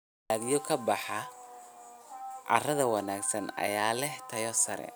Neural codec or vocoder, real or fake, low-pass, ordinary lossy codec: none; real; none; none